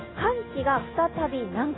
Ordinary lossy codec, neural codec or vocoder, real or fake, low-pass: AAC, 16 kbps; none; real; 7.2 kHz